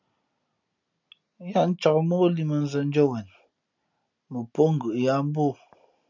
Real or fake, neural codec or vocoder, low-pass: real; none; 7.2 kHz